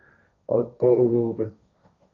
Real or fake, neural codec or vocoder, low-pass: fake; codec, 16 kHz, 1.1 kbps, Voila-Tokenizer; 7.2 kHz